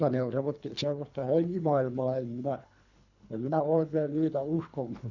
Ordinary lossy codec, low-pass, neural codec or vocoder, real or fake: none; 7.2 kHz; codec, 24 kHz, 3 kbps, HILCodec; fake